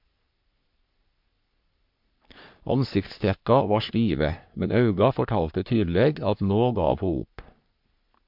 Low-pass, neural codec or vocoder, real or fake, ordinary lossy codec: 5.4 kHz; codec, 44.1 kHz, 3.4 kbps, Pupu-Codec; fake; MP3, 48 kbps